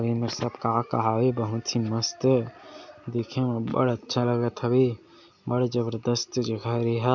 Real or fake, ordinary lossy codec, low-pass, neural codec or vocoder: real; MP3, 64 kbps; 7.2 kHz; none